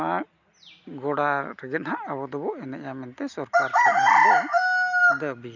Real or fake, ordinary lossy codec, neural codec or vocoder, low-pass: real; none; none; 7.2 kHz